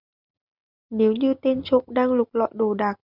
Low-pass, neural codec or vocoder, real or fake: 5.4 kHz; none; real